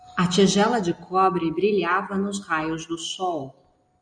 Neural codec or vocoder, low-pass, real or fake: none; 9.9 kHz; real